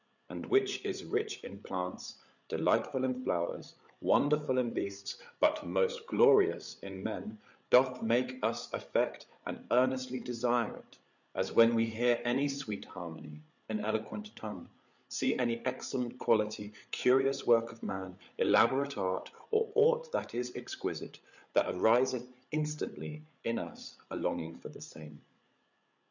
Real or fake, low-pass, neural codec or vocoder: fake; 7.2 kHz; codec, 16 kHz, 8 kbps, FreqCodec, larger model